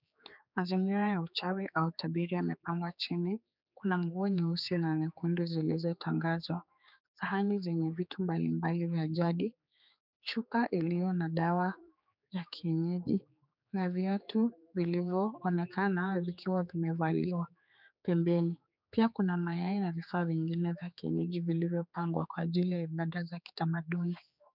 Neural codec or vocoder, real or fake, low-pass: codec, 16 kHz, 4 kbps, X-Codec, HuBERT features, trained on general audio; fake; 5.4 kHz